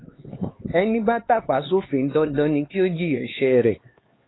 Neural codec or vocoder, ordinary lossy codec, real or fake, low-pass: codec, 16 kHz, 4 kbps, X-Codec, HuBERT features, trained on LibriSpeech; AAC, 16 kbps; fake; 7.2 kHz